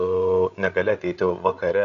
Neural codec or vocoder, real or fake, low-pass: codec, 16 kHz, 16 kbps, FreqCodec, larger model; fake; 7.2 kHz